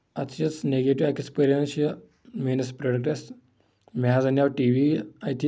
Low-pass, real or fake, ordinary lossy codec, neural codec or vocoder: none; real; none; none